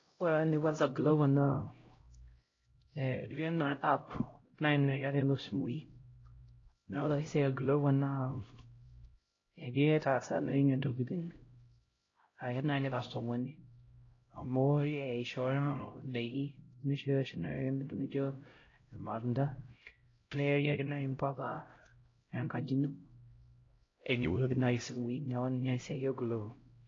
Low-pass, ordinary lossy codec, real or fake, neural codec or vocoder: 7.2 kHz; AAC, 32 kbps; fake; codec, 16 kHz, 0.5 kbps, X-Codec, HuBERT features, trained on LibriSpeech